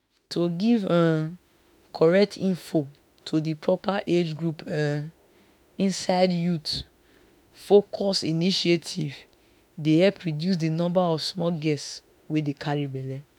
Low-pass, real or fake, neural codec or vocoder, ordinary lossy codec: 19.8 kHz; fake; autoencoder, 48 kHz, 32 numbers a frame, DAC-VAE, trained on Japanese speech; none